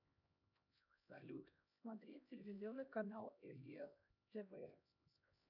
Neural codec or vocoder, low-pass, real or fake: codec, 16 kHz, 1 kbps, X-Codec, HuBERT features, trained on LibriSpeech; 5.4 kHz; fake